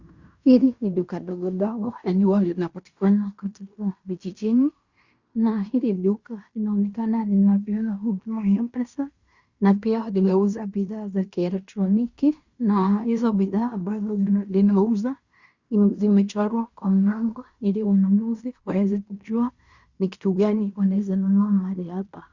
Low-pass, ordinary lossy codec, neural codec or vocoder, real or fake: 7.2 kHz; Opus, 64 kbps; codec, 16 kHz in and 24 kHz out, 0.9 kbps, LongCat-Audio-Codec, fine tuned four codebook decoder; fake